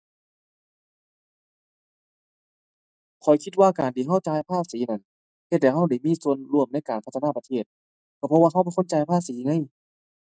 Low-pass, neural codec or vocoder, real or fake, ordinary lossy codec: none; none; real; none